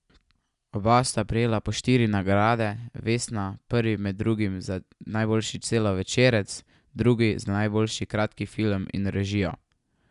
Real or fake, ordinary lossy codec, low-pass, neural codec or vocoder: real; none; 10.8 kHz; none